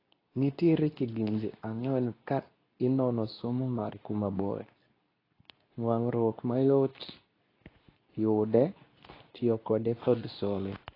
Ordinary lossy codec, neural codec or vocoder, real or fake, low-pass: AAC, 24 kbps; codec, 24 kHz, 0.9 kbps, WavTokenizer, medium speech release version 2; fake; 5.4 kHz